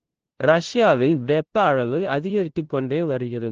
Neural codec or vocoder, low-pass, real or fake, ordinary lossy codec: codec, 16 kHz, 0.5 kbps, FunCodec, trained on LibriTTS, 25 frames a second; 7.2 kHz; fake; Opus, 16 kbps